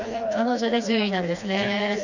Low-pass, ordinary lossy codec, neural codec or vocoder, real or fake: 7.2 kHz; none; codec, 16 kHz, 2 kbps, FreqCodec, smaller model; fake